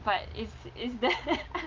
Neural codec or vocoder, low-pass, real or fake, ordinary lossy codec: none; 7.2 kHz; real; Opus, 32 kbps